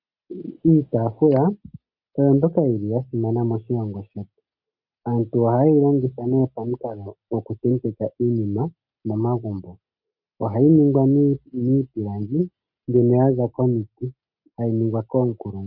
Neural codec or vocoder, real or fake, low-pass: none; real; 5.4 kHz